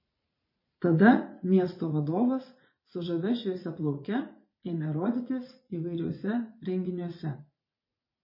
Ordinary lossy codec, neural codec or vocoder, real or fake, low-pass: MP3, 24 kbps; vocoder, 44.1 kHz, 80 mel bands, Vocos; fake; 5.4 kHz